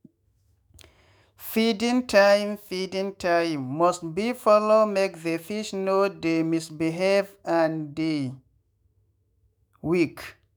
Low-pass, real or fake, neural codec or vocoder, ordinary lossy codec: none; fake; autoencoder, 48 kHz, 128 numbers a frame, DAC-VAE, trained on Japanese speech; none